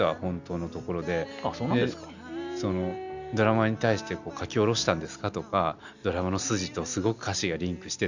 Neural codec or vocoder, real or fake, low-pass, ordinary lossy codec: none; real; 7.2 kHz; none